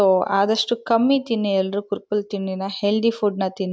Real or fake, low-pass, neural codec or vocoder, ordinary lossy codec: real; none; none; none